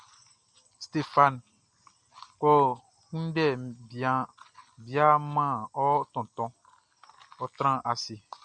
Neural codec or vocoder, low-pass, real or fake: none; 9.9 kHz; real